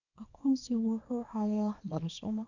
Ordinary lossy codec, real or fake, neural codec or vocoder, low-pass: none; fake; codec, 24 kHz, 0.9 kbps, WavTokenizer, small release; 7.2 kHz